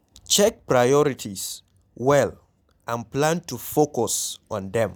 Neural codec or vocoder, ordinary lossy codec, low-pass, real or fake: none; none; none; real